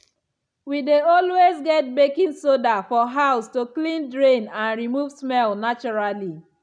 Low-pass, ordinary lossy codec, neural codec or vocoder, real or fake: 9.9 kHz; none; none; real